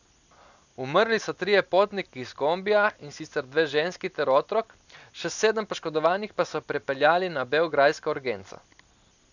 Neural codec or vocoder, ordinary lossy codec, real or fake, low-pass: none; none; real; 7.2 kHz